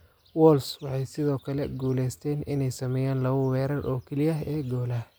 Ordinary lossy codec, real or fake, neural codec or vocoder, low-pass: none; real; none; none